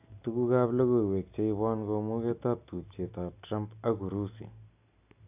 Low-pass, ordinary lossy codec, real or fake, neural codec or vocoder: 3.6 kHz; AAC, 32 kbps; real; none